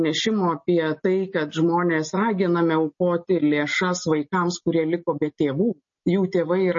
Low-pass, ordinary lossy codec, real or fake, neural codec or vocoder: 7.2 kHz; MP3, 32 kbps; real; none